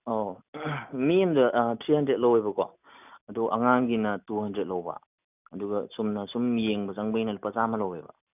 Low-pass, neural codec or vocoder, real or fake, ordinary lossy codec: 3.6 kHz; none; real; none